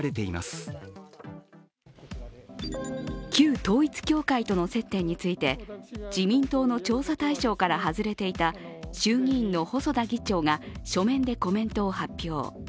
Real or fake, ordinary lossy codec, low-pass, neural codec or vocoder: real; none; none; none